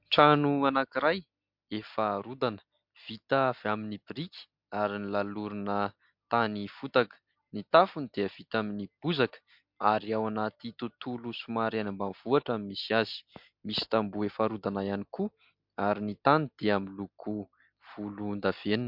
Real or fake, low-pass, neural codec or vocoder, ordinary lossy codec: real; 5.4 kHz; none; MP3, 48 kbps